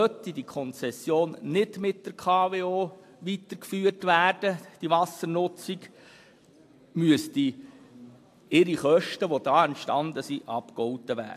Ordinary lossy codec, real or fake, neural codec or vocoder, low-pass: AAC, 64 kbps; real; none; 14.4 kHz